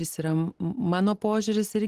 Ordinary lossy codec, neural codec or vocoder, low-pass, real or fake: Opus, 16 kbps; none; 14.4 kHz; real